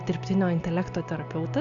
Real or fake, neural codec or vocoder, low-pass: real; none; 7.2 kHz